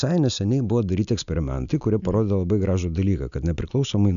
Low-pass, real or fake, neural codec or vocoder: 7.2 kHz; real; none